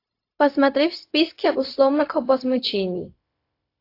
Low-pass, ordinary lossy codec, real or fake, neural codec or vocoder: 5.4 kHz; AAC, 32 kbps; fake; codec, 16 kHz, 0.4 kbps, LongCat-Audio-Codec